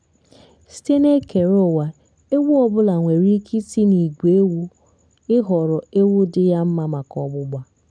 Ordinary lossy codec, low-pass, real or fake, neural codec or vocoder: none; 9.9 kHz; real; none